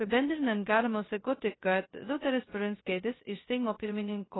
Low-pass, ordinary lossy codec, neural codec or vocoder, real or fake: 7.2 kHz; AAC, 16 kbps; codec, 16 kHz, 0.2 kbps, FocalCodec; fake